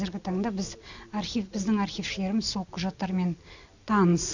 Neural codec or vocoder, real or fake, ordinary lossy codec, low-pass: none; real; none; 7.2 kHz